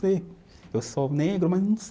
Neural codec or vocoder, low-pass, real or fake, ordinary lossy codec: none; none; real; none